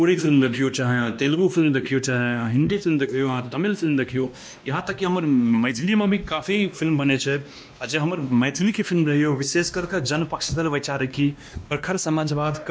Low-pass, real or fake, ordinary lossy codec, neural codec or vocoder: none; fake; none; codec, 16 kHz, 1 kbps, X-Codec, WavLM features, trained on Multilingual LibriSpeech